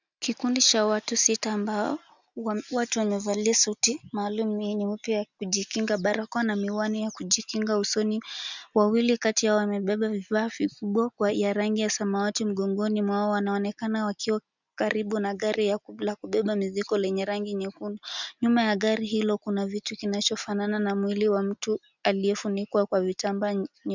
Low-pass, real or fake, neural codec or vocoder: 7.2 kHz; real; none